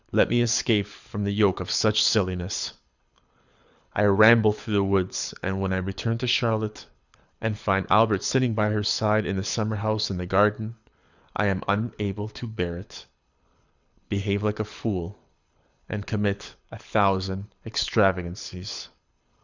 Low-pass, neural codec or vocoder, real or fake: 7.2 kHz; codec, 24 kHz, 6 kbps, HILCodec; fake